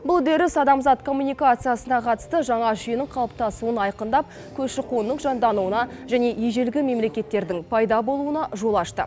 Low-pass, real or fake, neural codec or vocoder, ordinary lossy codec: none; real; none; none